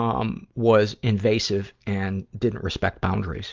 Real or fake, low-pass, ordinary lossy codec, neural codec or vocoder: real; 7.2 kHz; Opus, 24 kbps; none